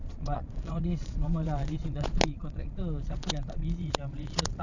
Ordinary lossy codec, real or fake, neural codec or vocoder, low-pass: none; fake; vocoder, 22.05 kHz, 80 mel bands, Vocos; 7.2 kHz